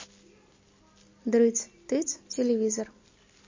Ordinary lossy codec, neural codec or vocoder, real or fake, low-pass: MP3, 32 kbps; none; real; 7.2 kHz